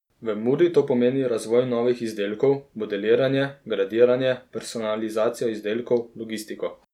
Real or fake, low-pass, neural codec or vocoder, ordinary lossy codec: real; 19.8 kHz; none; none